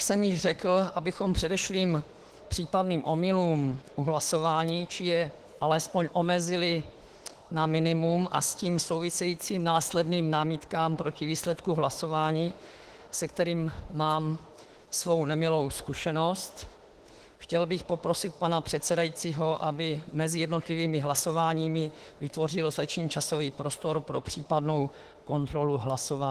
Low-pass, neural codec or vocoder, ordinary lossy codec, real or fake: 14.4 kHz; autoencoder, 48 kHz, 32 numbers a frame, DAC-VAE, trained on Japanese speech; Opus, 16 kbps; fake